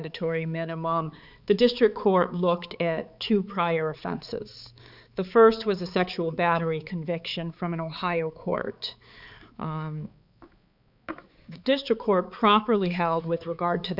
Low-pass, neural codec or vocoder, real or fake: 5.4 kHz; codec, 16 kHz, 4 kbps, X-Codec, HuBERT features, trained on balanced general audio; fake